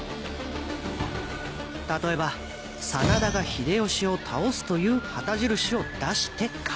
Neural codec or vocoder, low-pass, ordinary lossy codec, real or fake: none; none; none; real